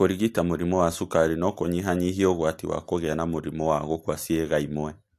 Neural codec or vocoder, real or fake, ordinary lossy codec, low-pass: none; real; AAC, 64 kbps; 14.4 kHz